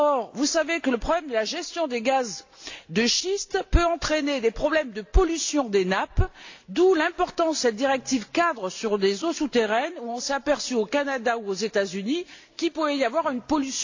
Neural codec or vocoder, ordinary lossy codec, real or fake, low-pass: none; AAC, 48 kbps; real; 7.2 kHz